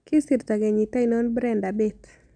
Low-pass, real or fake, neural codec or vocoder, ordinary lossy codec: 9.9 kHz; real; none; none